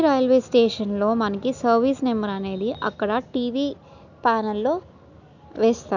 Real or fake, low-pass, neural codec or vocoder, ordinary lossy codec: real; 7.2 kHz; none; none